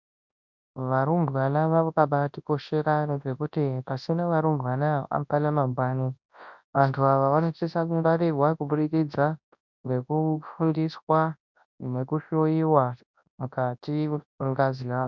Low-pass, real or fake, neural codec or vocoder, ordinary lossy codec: 7.2 kHz; fake; codec, 24 kHz, 0.9 kbps, WavTokenizer, large speech release; MP3, 64 kbps